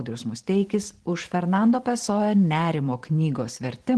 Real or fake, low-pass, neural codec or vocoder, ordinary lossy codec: fake; 10.8 kHz; vocoder, 44.1 kHz, 128 mel bands every 512 samples, BigVGAN v2; Opus, 16 kbps